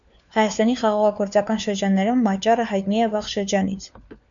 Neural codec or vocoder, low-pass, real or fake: codec, 16 kHz, 4 kbps, FunCodec, trained on LibriTTS, 50 frames a second; 7.2 kHz; fake